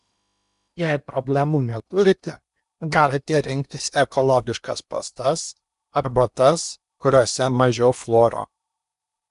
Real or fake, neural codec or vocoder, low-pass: fake; codec, 16 kHz in and 24 kHz out, 0.8 kbps, FocalCodec, streaming, 65536 codes; 10.8 kHz